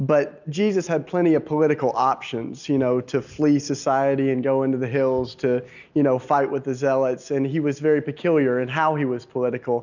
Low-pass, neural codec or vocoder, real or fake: 7.2 kHz; none; real